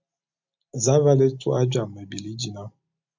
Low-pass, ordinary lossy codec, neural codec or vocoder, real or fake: 7.2 kHz; MP3, 64 kbps; none; real